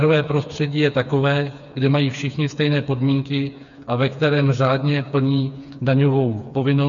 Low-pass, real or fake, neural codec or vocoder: 7.2 kHz; fake; codec, 16 kHz, 4 kbps, FreqCodec, smaller model